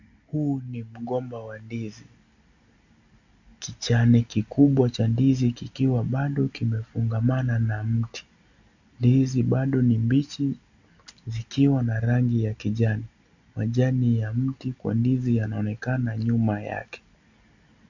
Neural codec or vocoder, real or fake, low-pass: none; real; 7.2 kHz